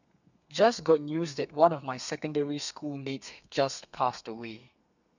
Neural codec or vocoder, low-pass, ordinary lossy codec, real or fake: codec, 32 kHz, 1.9 kbps, SNAC; 7.2 kHz; none; fake